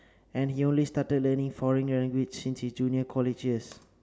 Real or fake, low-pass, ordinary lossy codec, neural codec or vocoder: real; none; none; none